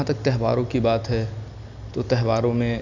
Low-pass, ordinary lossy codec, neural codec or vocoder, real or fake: 7.2 kHz; none; none; real